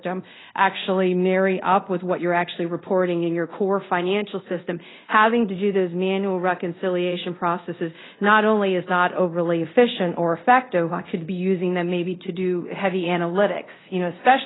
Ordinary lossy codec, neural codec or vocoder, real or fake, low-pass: AAC, 16 kbps; codec, 24 kHz, 0.5 kbps, DualCodec; fake; 7.2 kHz